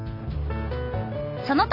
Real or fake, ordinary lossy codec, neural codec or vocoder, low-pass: real; none; none; 5.4 kHz